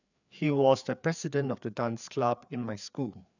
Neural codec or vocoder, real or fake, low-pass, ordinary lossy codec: codec, 16 kHz, 4 kbps, FreqCodec, larger model; fake; 7.2 kHz; none